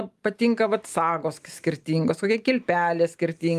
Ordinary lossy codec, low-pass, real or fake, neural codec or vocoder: Opus, 32 kbps; 14.4 kHz; real; none